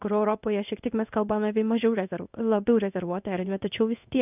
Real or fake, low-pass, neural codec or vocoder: fake; 3.6 kHz; codec, 16 kHz in and 24 kHz out, 1 kbps, XY-Tokenizer